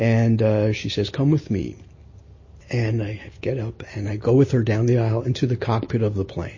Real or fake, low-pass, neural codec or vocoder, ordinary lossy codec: real; 7.2 kHz; none; MP3, 32 kbps